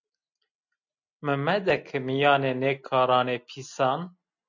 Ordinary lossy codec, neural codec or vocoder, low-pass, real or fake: MP3, 64 kbps; none; 7.2 kHz; real